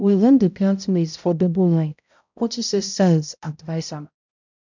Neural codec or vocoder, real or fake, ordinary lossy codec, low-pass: codec, 16 kHz, 0.5 kbps, X-Codec, HuBERT features, trained on balanced general audio; fake; none; 7.2 kHz